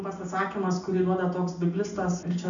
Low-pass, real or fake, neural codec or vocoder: 7.2 kHz; real; none